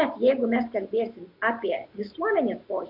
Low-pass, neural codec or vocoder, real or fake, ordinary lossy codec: 5.4 kHz; none; real; MP3, 48 kbps